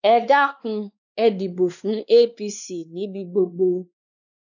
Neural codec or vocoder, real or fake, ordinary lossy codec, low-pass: codec, 16 kHz, 2 kbps, X-Codec, WavLM features, trained on Multilingual LibriSpeech; fake; none; 7.2 kHz